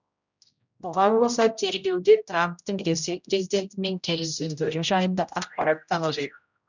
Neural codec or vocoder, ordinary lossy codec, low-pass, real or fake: codec, 16 kHz, 0.5 kbps, X-Codec, HuBERT features, trained on general audio; none; 7.2 kHz; fake